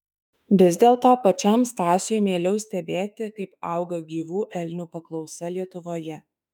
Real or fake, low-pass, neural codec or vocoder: fake; 19.8 kHz; autoencoder, 48 kHz, 32 numbers a frame, DAC-VAE, trained on Japanese speech